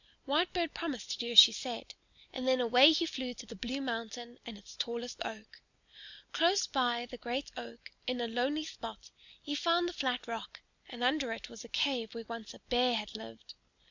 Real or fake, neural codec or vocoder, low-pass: real; none; 7.2 kHz